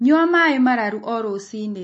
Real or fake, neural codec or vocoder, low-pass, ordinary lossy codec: real; none; 9.9 kHz; MP3, 32 kbps